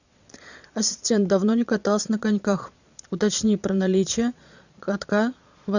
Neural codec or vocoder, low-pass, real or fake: vocoder, 22.05 kHz, 80 mel bands, Vocos; 7.2 kHz; fake